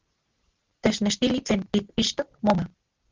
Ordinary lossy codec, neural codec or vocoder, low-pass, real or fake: Opus, 16 kbps; none; 7.2 kHz; real